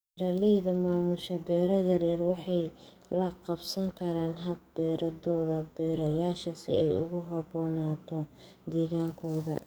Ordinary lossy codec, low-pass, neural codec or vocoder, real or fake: none; none; codec, 44.1 kHz, 2.6 kbps, SNAC; fake